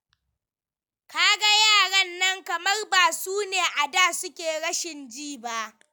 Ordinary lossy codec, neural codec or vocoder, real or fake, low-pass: none; none; real; none